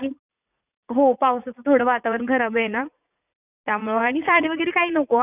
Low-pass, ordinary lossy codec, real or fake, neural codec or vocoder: 3.6 kHz; none; fake; vocoder, 44.1 kHz, 80 mel bands, Vocos